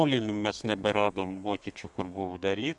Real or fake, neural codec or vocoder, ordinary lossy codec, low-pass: fake; codec, 32 kHz, 1.9 kbps, SNAC; AAC, 64 kbps; 10.8 kHz